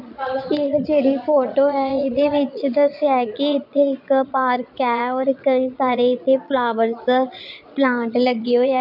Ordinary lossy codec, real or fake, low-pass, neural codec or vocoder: none; fake; 5.4 kHz; vocoder, 22.05 kHz, 80 mel bands, Vocos